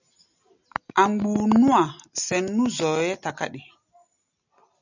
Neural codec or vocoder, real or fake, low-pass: none; real; 7.2 kHz